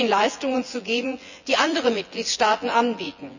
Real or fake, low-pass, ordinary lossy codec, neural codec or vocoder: fake; 7.2 kHz; none; vocoder, 24 kHz, 100 mel bands, Vocos